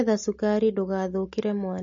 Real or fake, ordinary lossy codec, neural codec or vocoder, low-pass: real; MP3, 32 kbps; none; 7.2 kHz